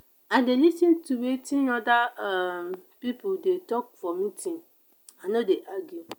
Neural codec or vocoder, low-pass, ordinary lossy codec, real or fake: none; none; none; real